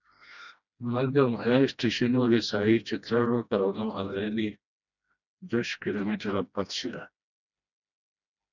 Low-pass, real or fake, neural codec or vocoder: 7.2 kHz; fake; codec, 16 kHz, 1 kbps, FreqCodec, smaller model